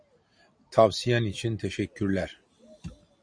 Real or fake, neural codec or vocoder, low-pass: real; none; 9.9 kHz